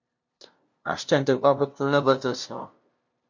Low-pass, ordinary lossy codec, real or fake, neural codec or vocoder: 7.2 kHz; MP3, 48 kbps; fake; codec, 16 kHz, 0.5 kbps, FunCodec, trained on LibriTTS, 25 frames a second